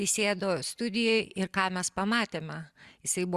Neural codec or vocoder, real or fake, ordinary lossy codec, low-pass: none; real; Opus, 64 kbps; 14.4 kHz